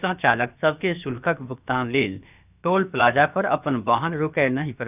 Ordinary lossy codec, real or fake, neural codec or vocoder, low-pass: none; fake; codec, 16 kHz, about 1 kbps, DyCAST, with the encoder's durations; 3.6 kHz